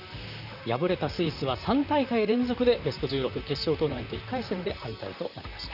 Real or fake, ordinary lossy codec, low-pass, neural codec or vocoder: fake; none; 5.4 kHz; vocoder, 44.1 kHz, 128 mel bands, Pupu-Vocoder